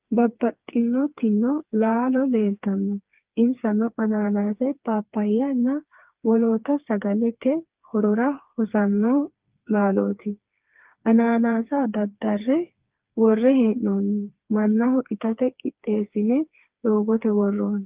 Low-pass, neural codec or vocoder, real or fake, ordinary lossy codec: 3.6 kHz; codec, 16 kHz, 4 kbps, FreqCodec, smaller model; fake; Opus, 32 kbps